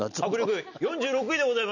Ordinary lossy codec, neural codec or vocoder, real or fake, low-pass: none; none; real; 7.2 kHz